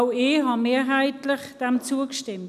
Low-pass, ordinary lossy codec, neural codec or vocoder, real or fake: 14.4 kHz; none; none; real